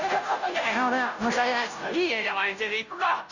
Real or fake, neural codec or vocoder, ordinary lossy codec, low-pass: fake; codec, 16 kHz, 0.5 kbps, FunCodec, trained on Chinese and English, 25 frames a second; none; 7.2 kHz